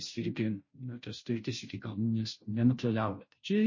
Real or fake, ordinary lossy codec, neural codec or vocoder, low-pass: fake; MP3, 32 kbps; codec, 16 kHz, 0.5 kbps, FunCodec, trained on Chinese and English, 25 frames a second; 7.2 kHz